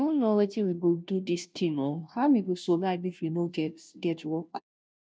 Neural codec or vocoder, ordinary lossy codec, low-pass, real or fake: codec, 16 kHz, 0.5 kbps, FunCodec, trained on Chinese and English, 25 frames a second; none; none; fake